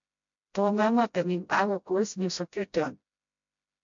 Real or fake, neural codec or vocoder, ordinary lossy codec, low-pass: fake; codec, 16 kHz, 0.5 kbps, FreqCodec, smaller model; MP3, 48 kbps; 7.2 kHz